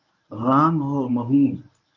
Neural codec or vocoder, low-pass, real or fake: codec, 24 kHz, 0.9 kbps, WavTokenizer, medium speech release version 1; 7.2 kHz; fake